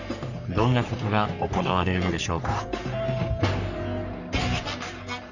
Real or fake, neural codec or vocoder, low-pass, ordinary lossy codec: fake; codec, 44.1 kHz, 3.4 kbps, Pupu-Codec; 7.2 kHz; none